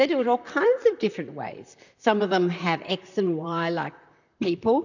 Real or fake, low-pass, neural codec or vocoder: fake; 7.2 kHz; vocoder, 44.1 kHz, 128 mel bands, Pupu-Vocoder